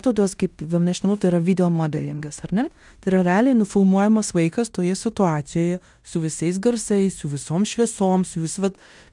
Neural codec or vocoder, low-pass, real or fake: codec, 16 kHz in and 24 kHz out, 0.9 kbps, LongCat-Audio-Codec, fine tuned four codebook decoder; 10.8 kHz; fake